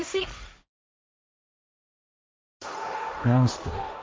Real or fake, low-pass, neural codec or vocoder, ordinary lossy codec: fake; none; codec, 16 kHz, 1.1 kbps, Voila-Tokenizer; none